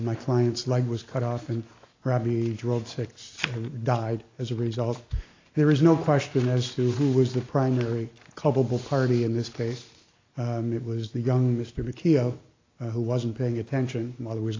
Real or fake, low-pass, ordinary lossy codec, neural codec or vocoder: real; 7.2 kHz; AAC, 32 kbps; none